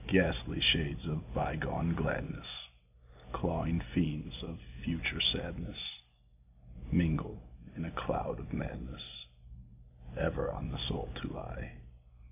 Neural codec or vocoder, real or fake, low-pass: none; real; 3.6 kHz